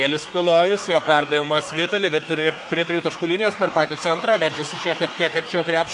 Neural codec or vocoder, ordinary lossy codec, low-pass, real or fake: codec, 24 kHz, 1 kbps, SNAC; AAC, 64 kbps; 10.8 kHz; fake